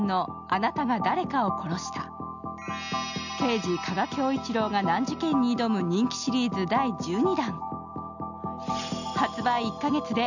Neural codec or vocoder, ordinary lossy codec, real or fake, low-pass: none; none; real; 7.2 kHz